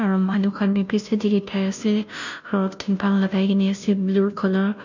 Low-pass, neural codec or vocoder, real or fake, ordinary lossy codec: 7.2 kHz; codec, 16 kHz, 0.5 kbps, FunCodec, trained on Chinese and English, 25 frames a second; fake; none